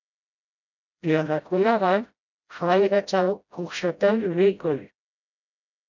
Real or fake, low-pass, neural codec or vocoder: fake; 7.2 kHz; codec, 16 kHz, 0.5 kbps, FreqCodec, smaller model